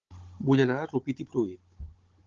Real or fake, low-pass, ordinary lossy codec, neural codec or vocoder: fake; 7.2 kHz; Opus, 16 kbps; codec, 16 kHz, 16 kbps, FunCodec, trained on Chinese and English, 50 frames a second